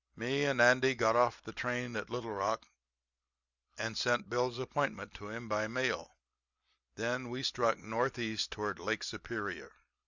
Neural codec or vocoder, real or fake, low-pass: none; real; 7.2 kHz